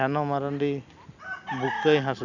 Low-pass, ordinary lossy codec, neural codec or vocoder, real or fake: 7.2 kHz; none; none; real